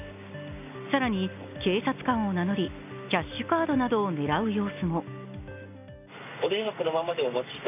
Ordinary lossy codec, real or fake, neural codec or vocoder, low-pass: none; real; none; 3.6 kHz